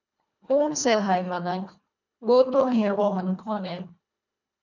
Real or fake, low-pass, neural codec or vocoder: fake; 7.2 kHz; codec, 24 kHz, 1.5 kbps, HILCodec